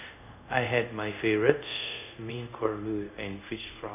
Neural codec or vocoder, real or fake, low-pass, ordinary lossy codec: codec, 24 kHz, 0.5 kbps, DualCodec; fake; 3.6 kHz; none